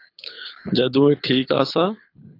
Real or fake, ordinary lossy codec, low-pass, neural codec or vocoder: fake; AAC, 32 kbps; 5.4 kHz; codec, 24 kHz, 6 kbps, HILCodec